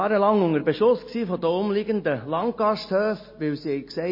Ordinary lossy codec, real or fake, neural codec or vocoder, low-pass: MP3, 24 kbps; real; none; 5.4 kHz